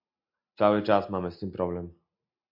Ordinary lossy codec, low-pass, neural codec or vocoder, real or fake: MP3, 48 kbps; 5.4 kHz; none; real